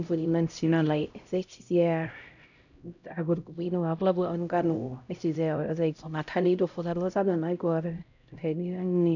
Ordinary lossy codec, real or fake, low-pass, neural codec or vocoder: none; fake; 7.2 kHz; codec, 16 kHz, 0.5 kbps, X-Codec, HuBERT features, trained on LibriSpeech